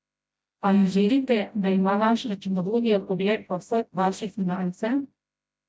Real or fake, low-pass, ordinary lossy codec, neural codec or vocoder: fake; none; none; codec, 16 kHz, 0.5 kbps, FreqCodec, smaller model